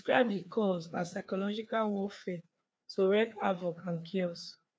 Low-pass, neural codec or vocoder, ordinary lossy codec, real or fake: none; codec, 16 kHz, 2 kbps, FreqCodec, larger model; none; fake